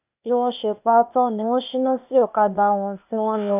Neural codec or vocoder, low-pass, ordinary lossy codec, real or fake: codec, 16 kHz, 0.8 kbps, ZipCodec; 3.6 kHz; AAC, 32 kbps; fake